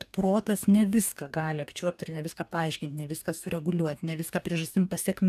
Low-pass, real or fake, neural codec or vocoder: 14.4 kHz; fake; codec, 44.1 kHz, 2.6 kbps, DAC